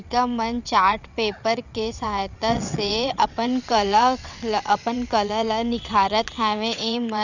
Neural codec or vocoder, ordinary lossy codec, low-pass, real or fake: vocoder, 22.05 kHz, 80 mel bands, WaveNeXt; none; 7.2 kHz; fake